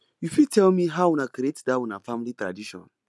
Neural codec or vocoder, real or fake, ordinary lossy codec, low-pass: none; real; none; none